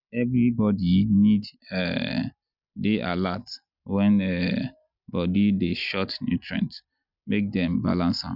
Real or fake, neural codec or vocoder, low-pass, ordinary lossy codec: real; none; 5.4 kHz; none